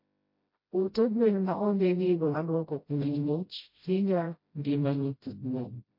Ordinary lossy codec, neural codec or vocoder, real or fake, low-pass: MP3, 32 kbps; codec, 16 kHz, 0.5 kbps, FreqCodec, smaller model; fake; 5.4 kHz